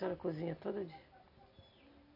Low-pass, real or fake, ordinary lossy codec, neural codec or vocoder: 5.4 kHz; real; none; none